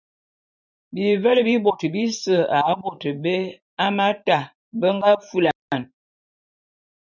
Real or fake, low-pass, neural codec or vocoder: fake; 7.2 kHz; vocoder, 44.1 kHz, 128 mel bands every 512 samples, BigVGAN v2